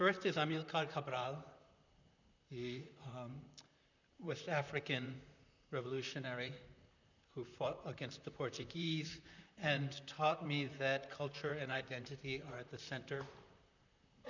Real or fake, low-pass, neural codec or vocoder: fake; 7.2 kHz; vocoder, 44.1 kHz, 128 mel bands, Pupu-Vocoder